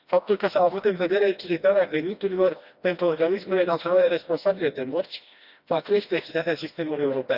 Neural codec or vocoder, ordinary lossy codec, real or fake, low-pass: codec, 16 kHz, 1 kbps, FreqCodec, smaller model; Opus, 64 kbps; fake; 5.4 kHz